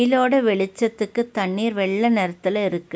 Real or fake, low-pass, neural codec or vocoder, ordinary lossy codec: real; none; none; none